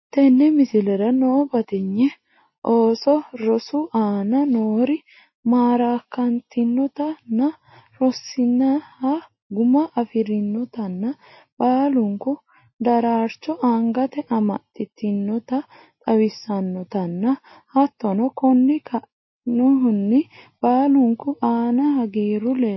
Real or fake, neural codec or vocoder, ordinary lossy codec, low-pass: real; none; MP3, 24 kbps; 7.2 kHz